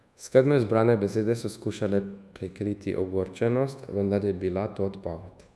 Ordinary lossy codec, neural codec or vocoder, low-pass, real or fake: none; codec, 24 kHz, 1.2 kbps, DualCodec; none; fake